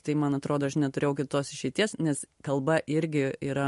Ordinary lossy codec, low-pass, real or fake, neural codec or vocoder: MP3, 48 kbps; 14.4 kHz; real; none